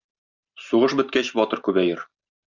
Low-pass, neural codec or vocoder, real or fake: 7.2 kHz; none; real